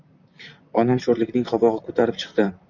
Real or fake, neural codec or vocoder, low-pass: fake; vocoder, 44.1 kHz, 128 mel bands, Pupu-Vocoder; 7.2 kHz